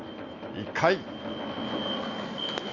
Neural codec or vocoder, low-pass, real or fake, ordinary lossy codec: none; 7.2 kHz; real; none